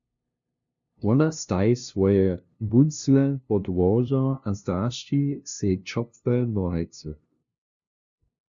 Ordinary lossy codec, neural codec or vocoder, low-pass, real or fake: MP3, 64 kbps; codec, 16 kHz, 0.5 kbps, FunCodec, trained on LibriTTS, 25 frames a second; 7.2 kHz; fake